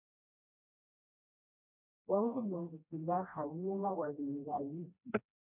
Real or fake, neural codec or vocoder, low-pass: fake; codec, 16 kHz, 1 kbps, FreqCodec, smaller model; 3.6 kHz